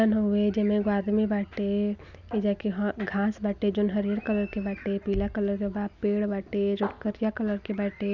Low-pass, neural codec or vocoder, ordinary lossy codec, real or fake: 7.2 kHz; none; none; real